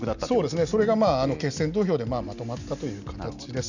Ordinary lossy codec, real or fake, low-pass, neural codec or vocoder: none; real; 7.2 kHz; none